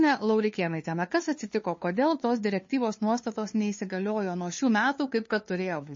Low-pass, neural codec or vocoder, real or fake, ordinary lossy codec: 7.2 kHz; codec, 16 kHz, 2 kbps, FunCodec, trained on LibriTTS, 25 frames a second; fake; MP3, 32 kbps